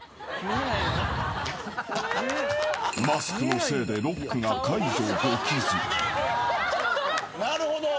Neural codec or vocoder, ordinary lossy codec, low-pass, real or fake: none; none; none; real